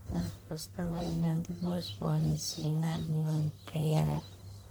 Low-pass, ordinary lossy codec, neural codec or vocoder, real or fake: none; none; codec, 44.1 kHz, 1.7 kbps, Pupu-Codec; fake